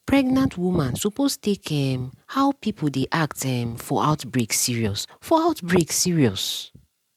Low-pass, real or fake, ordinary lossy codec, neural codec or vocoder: 19.8 kHz; real; MP3, 96 kbps; none